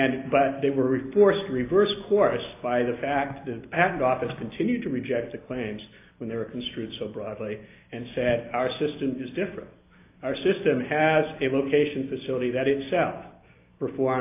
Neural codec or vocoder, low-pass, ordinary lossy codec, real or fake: none; 3.6 kHz; AAC, 24 kbps; real